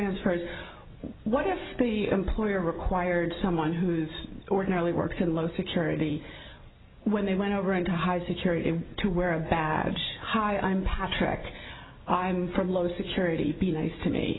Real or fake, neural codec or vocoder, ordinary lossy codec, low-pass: real; none; AAC, 16 kbps; 7.2 kHz